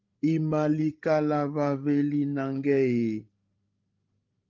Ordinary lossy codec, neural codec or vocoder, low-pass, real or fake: Opus, 32 kbps; codec, 16 kHz, 16 kbps, FreqCodec, larger model; 7.2 kHz; fake